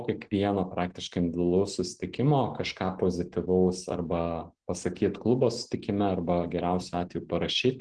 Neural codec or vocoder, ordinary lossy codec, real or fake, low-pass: none; Opus, 16 kbps; real; 9.9 kHz